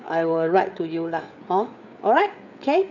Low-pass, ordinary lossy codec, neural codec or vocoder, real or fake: 7.2 kHz; none; codec, 16 kHz, 4 kbps, FreqCodec, larger model; fake